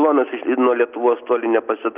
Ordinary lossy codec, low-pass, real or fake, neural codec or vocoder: Opus, 24 kbps; 3.6 kHz; real; none